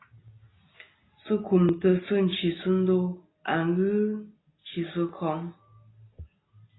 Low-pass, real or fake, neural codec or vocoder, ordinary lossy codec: 7.2 kHz; real; none; AAC, 16 kbps